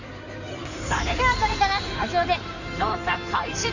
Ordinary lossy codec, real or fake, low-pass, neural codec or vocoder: none; fake; 7.2 kHz; codec, 16 kHz in and 24 kHz out, 2.2 kbps, FireRedTTS-2 codec